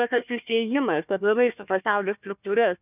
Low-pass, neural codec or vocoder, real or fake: 3.6 kHz; codec, 16 kHz, 1 kbps, FunCodec, trained on Chinese and English, 50 frames a second; fake